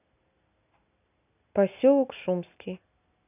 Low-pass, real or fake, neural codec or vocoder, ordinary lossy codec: 3.6 kHz; real; none; none